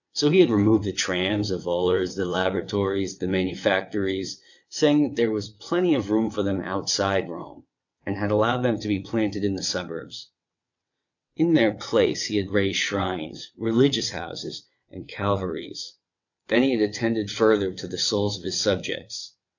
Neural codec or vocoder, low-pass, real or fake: vocoder, 22.05 kHz, 80 mel bands, WaveNeXt; 7.2 kHz; fake